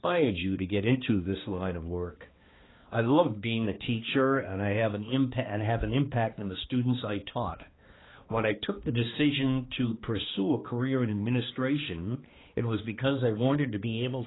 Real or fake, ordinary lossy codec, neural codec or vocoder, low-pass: fake; AAC, 16 kbps; codec, 16 kHz, 2 kbps, X-Codec, HuBERT features, trained on balanced general audio; 7.2 kHz